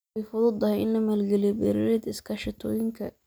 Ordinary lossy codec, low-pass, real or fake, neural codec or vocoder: none; none; real; none